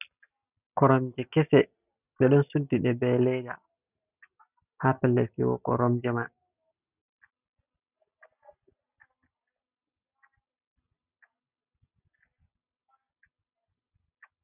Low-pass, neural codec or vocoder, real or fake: 3.6 kHz; none; real